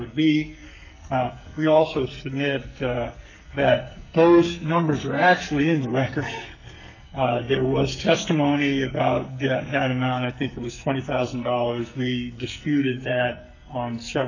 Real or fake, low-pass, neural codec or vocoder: fake; 7.2 kHz; codec, 44.1 kHz, 2.6 kbps, SNAC